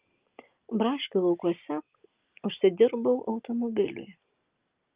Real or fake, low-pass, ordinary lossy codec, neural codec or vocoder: real; 3.6 kHz; Opus, 32 kbps; none